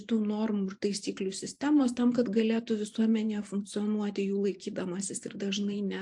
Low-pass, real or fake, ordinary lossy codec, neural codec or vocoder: 10.8 kHz; fake; AAC, 64 kbps; vocoder, 44.1 kHz, 128 mel bands every 512 samples, BigVGAN v2